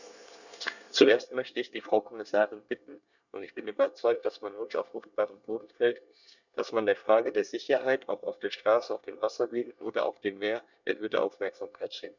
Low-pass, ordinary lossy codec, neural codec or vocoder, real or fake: 7.2 kHz; none; codec, 24 kHz, 1 kbps, SNAC; fake